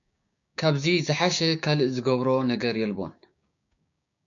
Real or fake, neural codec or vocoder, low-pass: fake; codec, 16 kHz, 6 kbps, DAC; 7.2 kHz